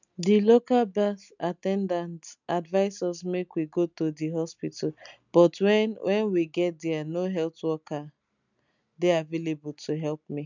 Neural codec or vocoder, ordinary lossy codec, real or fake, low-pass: none; none; real; 7.2 kHz